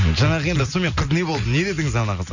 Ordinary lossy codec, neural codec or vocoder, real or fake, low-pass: none; none; real; 7.2 kHz